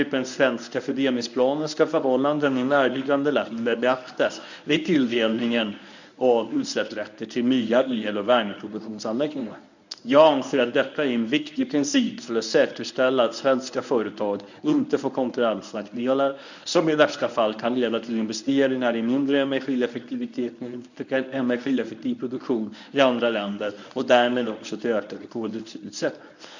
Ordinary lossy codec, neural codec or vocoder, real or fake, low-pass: none; codec, 24 kHz, 0.9 kbps, WavTokenizer, medium speech release version 1; fake; 7.2 kHz